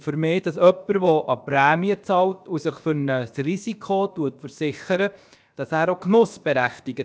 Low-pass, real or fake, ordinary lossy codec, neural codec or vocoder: none; fake; none; codec, 16 kHz, about 1 kbps, DyCAST, with the encoder's durations